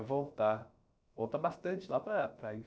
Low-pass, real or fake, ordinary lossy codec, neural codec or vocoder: none; fake; none; codec, 16 kHz, about 1 kbps, DyCAST, with the encoder's durations